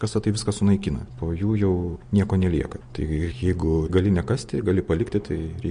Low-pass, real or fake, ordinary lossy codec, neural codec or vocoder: 9.9 kHz; real; MP3, 48 kbps; none